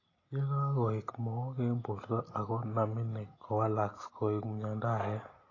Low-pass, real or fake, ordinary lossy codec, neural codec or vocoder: 7.2 kHz; real; AAC, 32 kbps; none